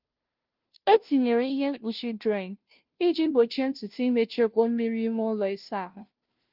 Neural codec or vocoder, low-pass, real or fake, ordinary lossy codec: codec, 16 kHz, 0.5 kbps, FunCodec, trained on Chinese and English, 25 frames a second; 5.4 kHz; fake; Opus, 32 kbps